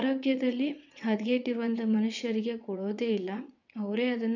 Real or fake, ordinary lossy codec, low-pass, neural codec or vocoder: fake; none; 7.2 kHz; vocoder, 22.05 kHz, 80 mel bands, WaveNeXt